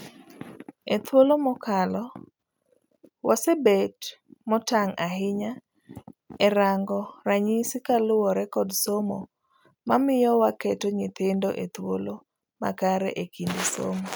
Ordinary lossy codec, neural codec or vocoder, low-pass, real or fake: none; none; none; real